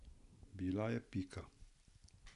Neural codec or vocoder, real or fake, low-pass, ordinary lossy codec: none; real; 10.8 kHz; none